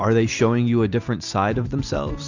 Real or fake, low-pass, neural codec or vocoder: real; 7.2 kHz; none